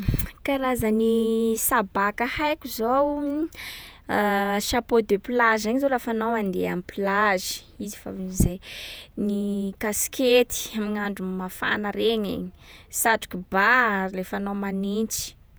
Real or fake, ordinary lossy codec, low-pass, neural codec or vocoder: fake; none; none; vocoder, 48 kHz, 128 mel bands, Vocos